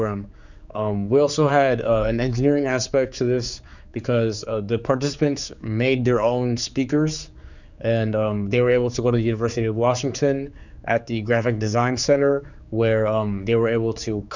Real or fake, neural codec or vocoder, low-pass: fake; codec, 16 kHz, 4 kbps, X-Codec, HuBERT features, trained on general audio; 7.2 kHz